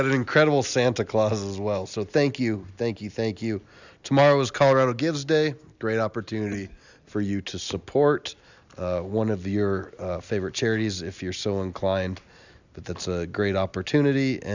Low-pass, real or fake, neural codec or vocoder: 7.2 kHz; real; none